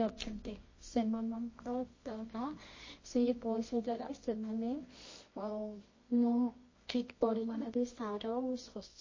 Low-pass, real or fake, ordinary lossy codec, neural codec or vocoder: 7.2 kHz; fake; MP3, 32 kbps; codec, 24 kHz, 0.9 kbps, WavTokenizer, medium music audio release